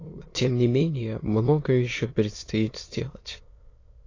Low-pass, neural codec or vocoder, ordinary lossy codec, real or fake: 7.2 kHz; autoencoder, 22.05 kHz, a latent of 192 numbers a frame, VITS, trained on many speakers; AAC, 32 kbps; fake